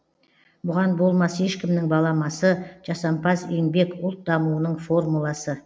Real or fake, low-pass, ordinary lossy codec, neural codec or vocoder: real; none; none; none